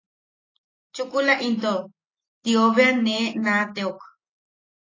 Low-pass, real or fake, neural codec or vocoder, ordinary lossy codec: 7.2 kHz; real; none; AAC, 32 kbps